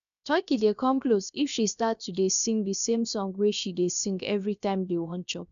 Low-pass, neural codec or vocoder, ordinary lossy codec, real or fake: 7.2 kHz; codec, 16 kHz, 0.7 kbps, FocalCodec; none; fake